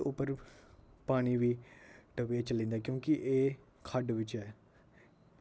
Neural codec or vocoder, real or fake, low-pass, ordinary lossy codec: none; real; none; none